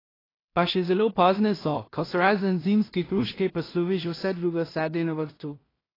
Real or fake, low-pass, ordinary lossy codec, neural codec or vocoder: fake; 5.4 kHz; AAC, 24 kbps; codec, 16 kHz in and 24 kHz out, 0.4 kbps, LongCat-Audio-Codec, two codebook decoder